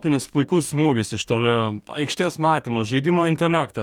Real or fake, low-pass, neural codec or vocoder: fake; 19.8 kHz; codec, 44.1 kHz, 2.6 kbps, DAC